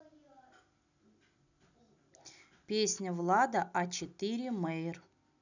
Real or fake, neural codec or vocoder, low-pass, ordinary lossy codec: real; none; 7.2 kHz; none